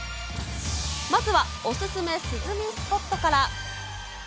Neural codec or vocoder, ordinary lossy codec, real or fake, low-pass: none; none; real; none